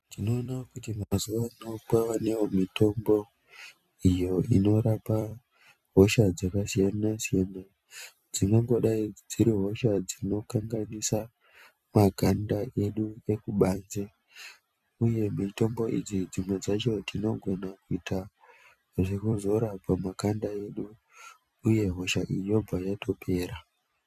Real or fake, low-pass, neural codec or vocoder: fake; 14.4 kHz; vocoder, 44.1 kHz, 128 mel bands every 256 samples, BigVGAN v2